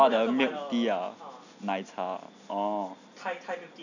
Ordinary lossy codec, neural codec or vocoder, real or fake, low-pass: none; none; real; 7.2 kHz